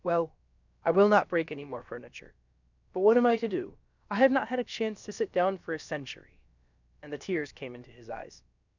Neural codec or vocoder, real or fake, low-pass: codec, 16 kHz, about 1 kbps, DyCAST, with the encoder's durations; fake; 7.2 kHz